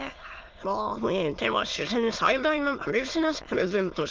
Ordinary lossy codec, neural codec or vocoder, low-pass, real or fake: Opus, 32 kbps; autoencoder, 22.05 kHz, a latent of 192 numbers a frame, VITS, trained on many speakers; 7.2 kHz; fake